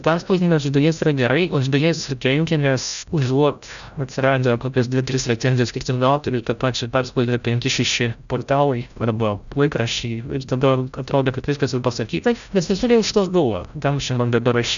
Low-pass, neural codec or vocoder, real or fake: 7.2 kHz; codec, 16 kHz, 0.5 kbps, FreqCodec, larger model; fake